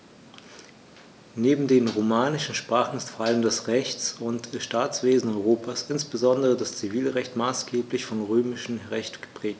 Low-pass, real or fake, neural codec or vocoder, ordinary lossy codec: none; real; none; none